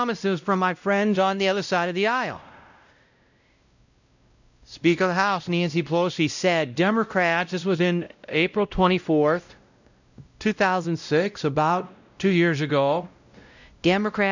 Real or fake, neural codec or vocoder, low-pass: fake; codec, 16 kHz, 0.5 kbps, X-Codec, WavLM features, trained on Multilingual LibriSpeech; 7.2 kHz